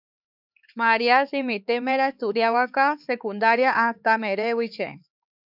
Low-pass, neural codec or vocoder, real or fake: 5.4 kHz; codec, 16 kHz, 4 kbps, X-Codec, HuBERT features, trained on LibriSpeech; fake